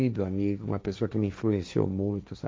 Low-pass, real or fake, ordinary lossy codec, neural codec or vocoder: none; fake; none; codec, 16 kHz, 1.1 kbps, Voila-Tokenizer